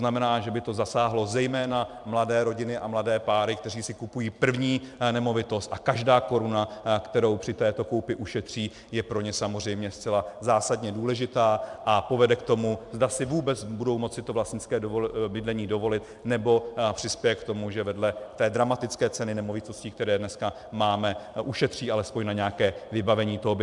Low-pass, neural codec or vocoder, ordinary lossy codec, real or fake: 10.8 kHz; vocoder, 44.1 kHz, 128 mel bands every 256 samples, BigVGAN v2; MP3, 96 kbps; fake